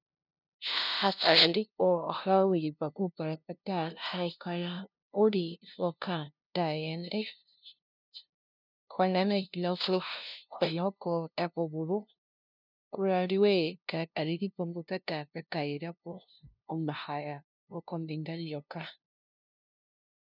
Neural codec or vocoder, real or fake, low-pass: codec, 16 kHz, 0.5 kbps, FunCodec, trained on LibriTTS, 25 frames a second; fake; 5.4 kHz